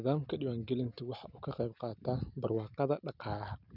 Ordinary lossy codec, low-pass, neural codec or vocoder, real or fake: none; 5.4 kHz; none; real